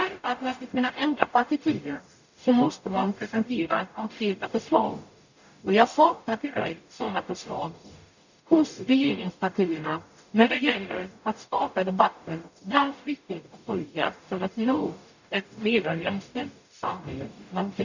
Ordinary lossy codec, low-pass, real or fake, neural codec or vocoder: none; 7.2 kHz; fake; codec, 44.1 kHz, 0.9 kbps, DAC